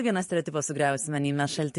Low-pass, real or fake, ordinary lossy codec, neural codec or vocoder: 14.4 kHz; fake; MP3, 48 kbps; vocoder, 44.1 kHz, 128 mel bands every 512 samples, BigVGAN v2